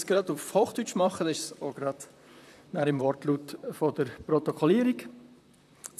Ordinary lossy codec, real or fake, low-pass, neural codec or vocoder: none; fake; 14.4 kHz; vocoder, 44.1 kHz, 128 mel bands, Pupu-Vocoder